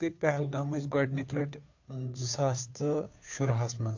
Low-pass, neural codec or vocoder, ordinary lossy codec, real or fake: 7.2 kHz; codec, 16 kHz, 2 kbps, FunCodec, trained on Chinese and English, 25 frames a second; AAC, 48 kbps; fake